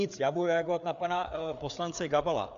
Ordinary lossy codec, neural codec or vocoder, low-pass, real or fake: MP3, 64 kbps; codec, 16 kHz, 4 kbps, FreqCodec, larger model; 7.2 kHz; fake